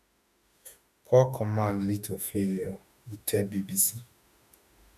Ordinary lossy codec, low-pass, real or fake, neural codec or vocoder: none; 14.4 kHz; fake; autoencoder, 48 kHz, 32 numbers a frame, DAC-VAE, trained on Japanese speech